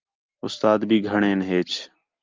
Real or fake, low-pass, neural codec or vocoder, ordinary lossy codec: real; 7.2 kHz; none; Opus, 24 kbps